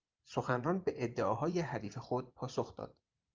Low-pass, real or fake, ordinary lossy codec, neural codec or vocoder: 7.2 kHz; fake; Opus, 24 kbps; vocoder, 24 kHz, 100 mel bands, Vocos